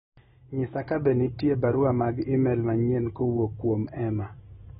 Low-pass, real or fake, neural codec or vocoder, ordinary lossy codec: 14.4 kHz; real; none; AAC, 16 kbps